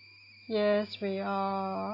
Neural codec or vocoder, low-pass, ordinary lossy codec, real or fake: none; 5.4 kHz; AAC, 32 kbps; real